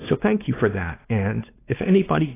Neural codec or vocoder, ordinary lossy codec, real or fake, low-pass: codec, 16 kHz, 2 kbps, X-Codec, WavLM features, trained on Multilingual LibriSpeech; AAC, 16 kbps; fake; 3.6 kHz